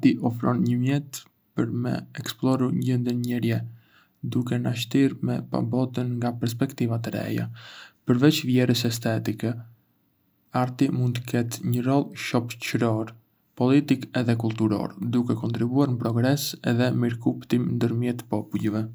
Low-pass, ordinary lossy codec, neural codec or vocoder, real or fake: none; none; none; real